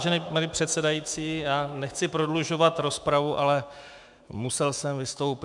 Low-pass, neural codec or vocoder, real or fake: 10.8 kHz; autoencoder, 48 kHz, 128 numbers a frame, DAC-VAE, trained on Japanese speech; fake